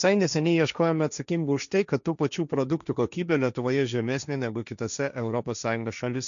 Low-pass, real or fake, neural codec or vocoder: 7.2 kHz; fake; codec, 16 kHz, 1.1 kbps, Voila-Tokenizer